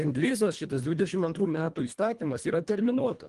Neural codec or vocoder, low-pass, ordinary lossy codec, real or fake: codec, 24 kHz, 1.5 kbps, HILCodec; 10.8 kHz; Opus, 24 kbps; fake